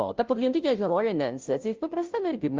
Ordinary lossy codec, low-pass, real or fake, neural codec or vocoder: Opus, 24 kbps; 7.2 kHz; fake; codec, 16 kHz, 0.5 kbps, FunCodec, trained on Chinese and English, 25 frames a second